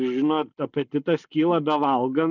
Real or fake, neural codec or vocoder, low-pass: real; none; 7.2 kHz